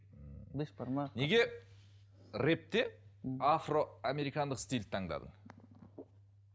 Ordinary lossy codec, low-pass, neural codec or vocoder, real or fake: none; none; none; real